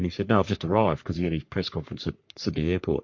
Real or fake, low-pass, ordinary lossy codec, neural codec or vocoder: fake; 7.2 kHz; MP3, 48 kbps; codec, 44.1 kHz, 3.4 kbps, Pupu-Codec